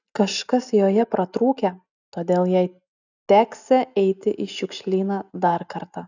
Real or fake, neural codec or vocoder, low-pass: real; none; 7.2 kHz